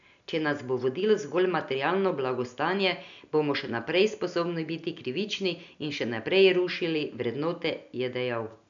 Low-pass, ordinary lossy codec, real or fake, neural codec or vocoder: 7.2 kHz; MP3, 96 kbps; real; none